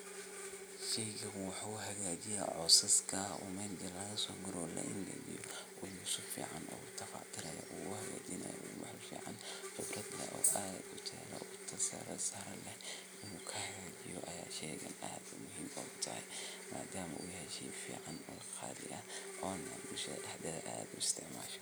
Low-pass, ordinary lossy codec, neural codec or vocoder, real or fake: none; none; none; real